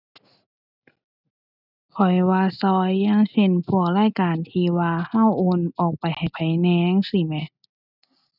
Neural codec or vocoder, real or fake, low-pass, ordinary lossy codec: none; real; 5.4 kHz; none